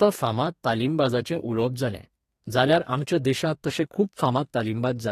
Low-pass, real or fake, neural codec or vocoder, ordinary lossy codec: 14.4 kHz; fake; codec, 32 kHz, 1.9 kbps, SNAC; AAC, 48 kbps